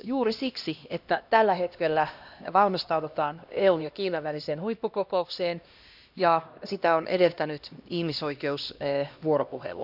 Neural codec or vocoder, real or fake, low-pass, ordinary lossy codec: codec, 16 kHz, 1 kbps, X-Codec, HuBERT features, trained on LibriSpeech; fake; 5.4 kHz; none